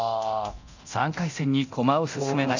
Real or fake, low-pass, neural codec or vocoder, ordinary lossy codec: fake; 7.2 kHz; codec, 24 kHz, 0.9 kbps, DualCodec; none